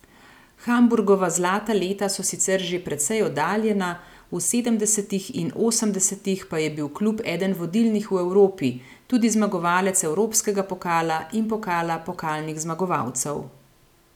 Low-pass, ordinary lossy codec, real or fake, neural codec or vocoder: 19.8 kHz; none; real; none